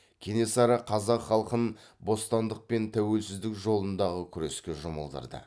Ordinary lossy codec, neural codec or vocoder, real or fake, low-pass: none; none; real; none